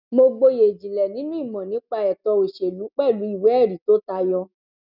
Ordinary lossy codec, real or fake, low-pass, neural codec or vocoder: none; real; 5.4 kHz; none